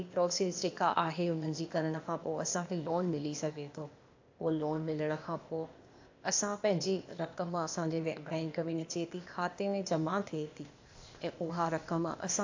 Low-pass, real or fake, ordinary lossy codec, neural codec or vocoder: 7.2 kHz; fake; AAC, 48 kbps; codec, 16 kHz, 0.8 kbps, ZipCodec